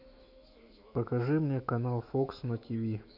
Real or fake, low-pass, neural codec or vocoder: fake; 5.4 kHz; autoencoder, 48 kHz, 128 numbers a frame, DAC-VAE, trained on Japanese speech